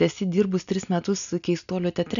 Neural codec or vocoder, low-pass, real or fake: none; 7.2 kHz; real